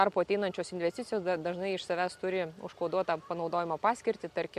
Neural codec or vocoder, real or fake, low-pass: none; real; 14.4 kHz